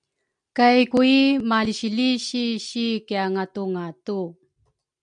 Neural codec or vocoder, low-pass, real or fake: none; 9.9 kHz; real